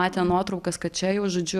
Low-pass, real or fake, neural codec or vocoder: 14.4 kHz; real; none